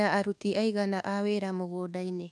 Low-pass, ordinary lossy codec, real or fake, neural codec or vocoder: none; none; fake; codec, 24 kHz, 1.2 kbps, DualCodec